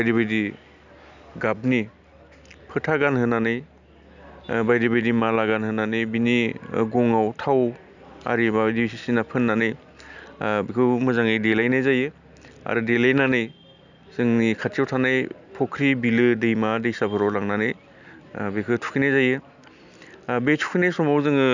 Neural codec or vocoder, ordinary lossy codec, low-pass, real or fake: none; none; 7.2 kHz; real